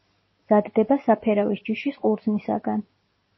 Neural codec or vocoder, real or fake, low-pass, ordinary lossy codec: none; real; 7.2 kHz; MP3, 24 kbps